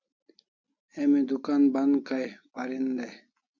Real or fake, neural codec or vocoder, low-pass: real; none; 7.2 kHz